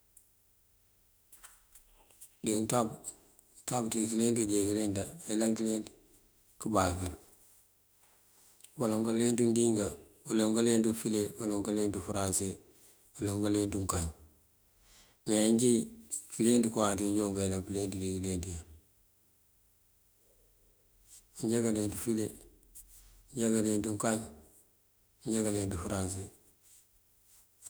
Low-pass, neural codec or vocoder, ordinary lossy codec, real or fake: none; autoencoder, 48 kHz, 32 numbers a frame, DAC-VAE, trained on Japanese speech; none; fake